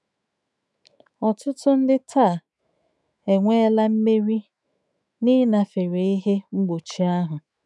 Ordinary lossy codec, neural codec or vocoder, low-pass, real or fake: none; autoencoder, 48 kHz, 128 numbers a frame, DAC-VAE, trained on Japanese speech; 10.8 kHz; fake